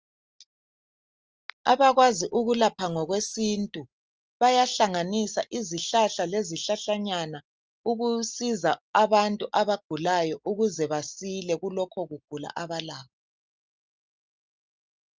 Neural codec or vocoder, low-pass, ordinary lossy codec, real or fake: none; 7.2 kHz; Opus, 24 kbps; real